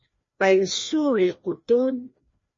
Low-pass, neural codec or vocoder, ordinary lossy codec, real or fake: 7.2 kHz; codec, 16 kHz, 1 kbps, FreqCodec, larger model; MP3, 32 kbps; fake